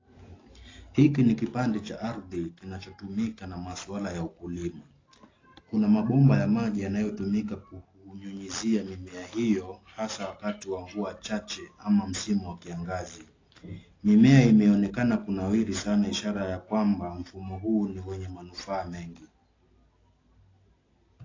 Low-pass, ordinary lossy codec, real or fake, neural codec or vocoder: 7.2 kHz; AAC, 32 kbps; real; none